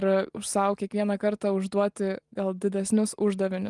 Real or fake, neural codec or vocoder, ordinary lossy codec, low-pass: real; none; Opus, 24 kbps; 10.8 kHz